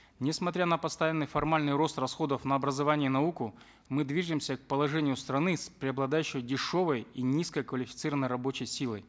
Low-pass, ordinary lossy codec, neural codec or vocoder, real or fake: none; none; none; real